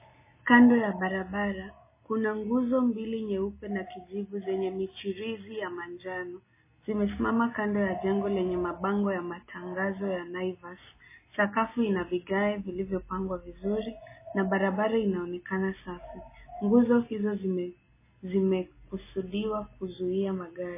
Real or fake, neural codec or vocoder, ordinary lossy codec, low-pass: real; none; MP3, 16 kbps; 3.6 kHz